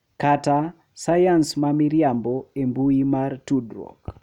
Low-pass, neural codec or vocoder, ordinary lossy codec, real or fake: 19.8 kHz; none; none; real